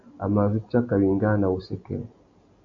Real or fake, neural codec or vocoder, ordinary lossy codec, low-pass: real; none; AAC, 48 kbps; 7.2 kHz